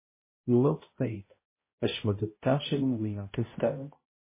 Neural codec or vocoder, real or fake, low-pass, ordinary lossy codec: codec, 16 kHz, 0.5 kbps, X-Codec, HuBERT features, trained on balanced general audio; fake; 3.6 kHz; MP3, 16 kbps